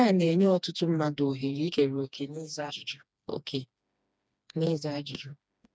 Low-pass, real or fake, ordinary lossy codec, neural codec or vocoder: none; fake; none; codec, 16 kHz, 2 kbps, FreqCodec, smaller model